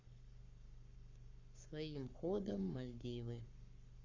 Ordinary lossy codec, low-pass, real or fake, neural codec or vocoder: Opus, 64 kbps; 7.2 kHz; fake; codec, 44.1 kHz, 7.8 kbps, Pupu-Codec